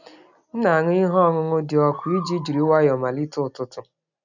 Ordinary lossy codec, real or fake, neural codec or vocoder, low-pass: none; real; none; 7.2 kHz